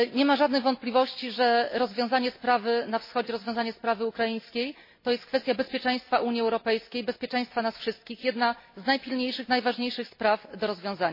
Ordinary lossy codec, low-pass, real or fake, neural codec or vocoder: MP3, 24 kbps; 5.4 kHz; real; none